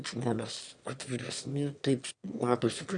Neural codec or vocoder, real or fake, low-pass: autoencoder, 22.05 kHz, a latent of 192 numbers a frame, VITS, trained on one speaker; fake; 9.9 kHz